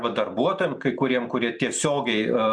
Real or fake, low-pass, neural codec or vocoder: real; 9.9 kHz; none